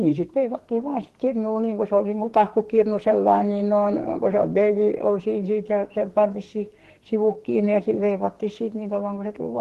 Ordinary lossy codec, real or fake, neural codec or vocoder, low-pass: Opus, 16 kbps; fake; codec, 44.1 kHz, 2.6 kbps, SNAC; 14.4 kHz